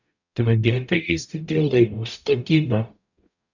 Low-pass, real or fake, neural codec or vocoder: 7.2 kHz; fake; codec, 44.1 kHz, 0.9 kbps, DAC